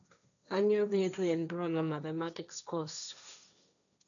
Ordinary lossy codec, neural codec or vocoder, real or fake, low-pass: none; codec, 16 kHz, 1.1 kbps, Voila-Tokenizer; fake; 7.2 kHz